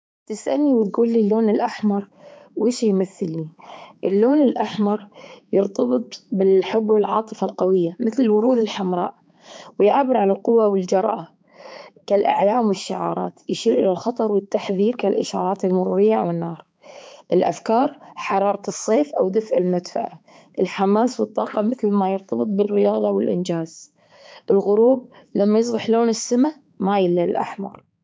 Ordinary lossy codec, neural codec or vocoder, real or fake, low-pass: none; codec, 16 kHz, 4 kbps, X-Codec, HuBERT features, trained on balanced general audio; fake; none